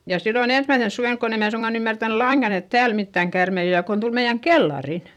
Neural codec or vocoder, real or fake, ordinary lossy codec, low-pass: vocoder, 44.1 kHz, 128 mel bands every 256 samples, BigVGAN v2; fake; none; 19.8 kHz